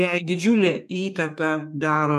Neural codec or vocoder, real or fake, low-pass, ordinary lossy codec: codec, 32 kHz, 1.9 kbps, SNAC; fake; 14.4 kHz; MP3, 96 kbps